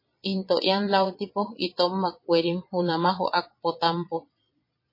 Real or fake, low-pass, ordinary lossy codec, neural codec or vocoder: fake; 5.4 kHz; MP3, 24 kbps; vocoder, 22.05 kHz, 80 mel bands, Vocos